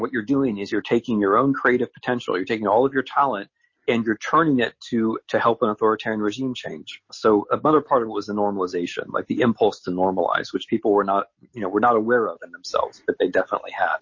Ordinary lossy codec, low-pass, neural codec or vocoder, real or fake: MP3, 32 kbps; 7.2 kHz; none; real